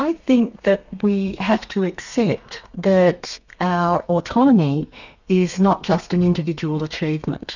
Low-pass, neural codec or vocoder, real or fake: 7.2 kHz; codec, 32 kHz, 1.9 kbps, SNAC; fake